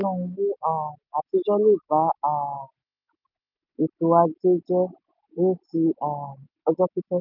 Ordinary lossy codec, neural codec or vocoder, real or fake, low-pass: none; none; real; 5.4 kHz